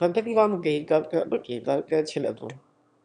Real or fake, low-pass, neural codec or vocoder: fake; 9.9 kHz; autoencoder, 22.05 kHz, a latent of 192 numbers a frame, VITS, trained on one speaker